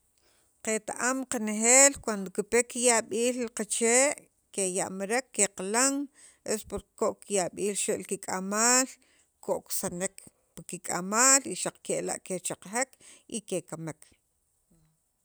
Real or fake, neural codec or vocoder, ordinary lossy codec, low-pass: real; none; none; none